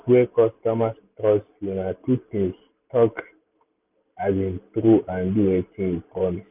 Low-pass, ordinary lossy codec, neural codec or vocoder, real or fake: 3.6 kHz; none; none; real